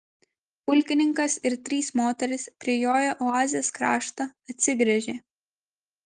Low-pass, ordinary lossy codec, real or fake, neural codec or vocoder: 10.8 kHz; Opus, 24 kbps; real; none